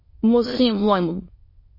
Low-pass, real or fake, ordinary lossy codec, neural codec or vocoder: 5.4 kHz; fake; MP3, 24 kbps; autoencoder, 22.05 kHz, a latent of 192 numbers a frame, VITS, trained on many speakers